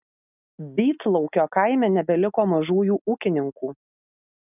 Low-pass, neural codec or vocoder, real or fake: 3.6 kHz; none; real